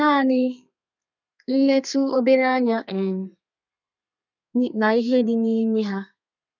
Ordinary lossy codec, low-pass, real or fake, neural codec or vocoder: none; 7.2 kHz; fake; codec, 32 kHz, 1.9 kbps, SNAC